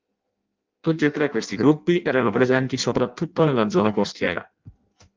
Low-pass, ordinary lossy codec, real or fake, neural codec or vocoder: 7.2 kHz; Opus, 24 kbps; fake; codec, 16 kHz in and 24 kHz out, 0.6 kbps, FireRedTTS-2 codec